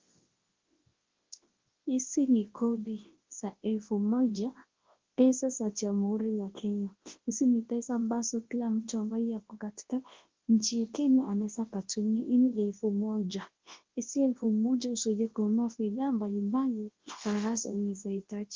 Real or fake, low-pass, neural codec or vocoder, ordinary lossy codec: fake; 7.2 kHz; codec, 24 kHz, 0.9 kbps, WavTokenizer, large speech release; Opus, 16 kbps